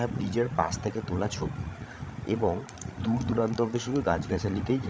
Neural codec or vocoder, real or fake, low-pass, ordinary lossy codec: codec, 16 kHz, 16 kbps, FreqCodec, larger model; fake; none; none